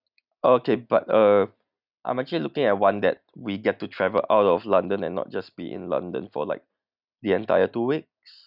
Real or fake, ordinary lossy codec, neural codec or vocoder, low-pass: real; none; none; 5.4 kHz